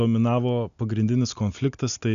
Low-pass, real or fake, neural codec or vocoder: 7.2 kHz; real; none